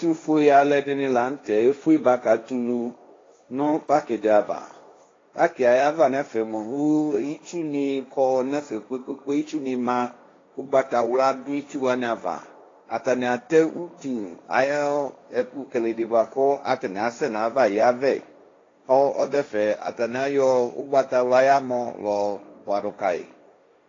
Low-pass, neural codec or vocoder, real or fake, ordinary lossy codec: 7.2 kHz; codec, 16 kHz, 1.1 kbps, Voila-Tokenizer; fake; AAC, 32 kbps